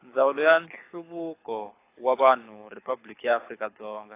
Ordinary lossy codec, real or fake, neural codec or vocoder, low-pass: AAC, 24 kbps; fake; codec, 24 kHz, 6 kbps, HILCodec; 3.6 kHz